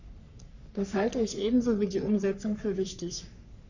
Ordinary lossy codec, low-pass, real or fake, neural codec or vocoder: none; 7.2 kHz; fake; codec, 44.1 kHz, 3.4 kbps, Pupu-Codec